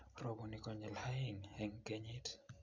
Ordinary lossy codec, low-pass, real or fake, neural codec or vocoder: MP3, 64 kbps; 7.2 kHz; real; none